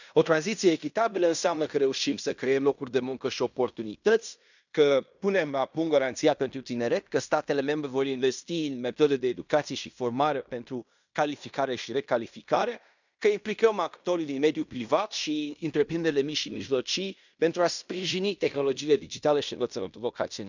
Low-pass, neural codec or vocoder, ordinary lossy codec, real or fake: 7.2 kHz; codec, 16 kHz in and 24 kHz out, 0.9 kbps, LongCat-Audio-Codec, fine tuned four codebook decoder; none; fake